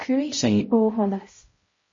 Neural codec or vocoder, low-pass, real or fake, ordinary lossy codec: codec, 16 kHz, 0.5 kbps, X-Codec, HuBERT features, trained on balanced general audio; 7.2 kHz; fake; MP3, 32 kbps